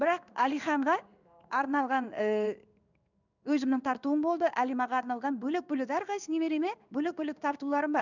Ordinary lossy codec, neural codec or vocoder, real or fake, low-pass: none; codec, 16 kHz in and 24 kHz out, 1 kbps, XY-Tokenizer; fake; 7.2 kHz